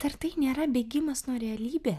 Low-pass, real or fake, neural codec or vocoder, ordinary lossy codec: 14.4 kHz; real; none; AAC, 96 kbps